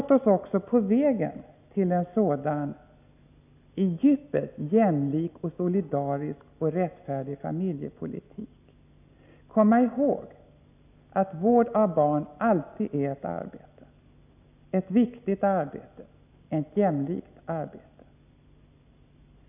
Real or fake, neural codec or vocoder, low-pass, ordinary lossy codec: real; none; 3.6 kHz; none